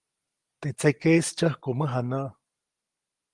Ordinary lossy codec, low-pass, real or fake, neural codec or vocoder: Opus, 24 kbps; 10.8 kHz; real; none